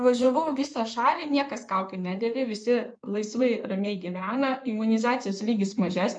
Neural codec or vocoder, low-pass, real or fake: codec, 16 kHz in and 24 kHz out, 2.2 kbps, FireRedTTS-2 codec; 9.9 kHz; fake